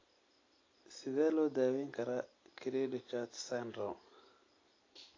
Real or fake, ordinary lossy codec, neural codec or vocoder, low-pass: real; AAC, 32 kbps; none; 7.2 kHz